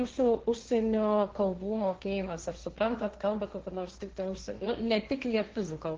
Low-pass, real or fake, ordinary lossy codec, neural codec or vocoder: 7.2 kHz; fake; Opus, 16 kbps; codec, 16 kHz, 1.1 kbps, Voila-Tokenizer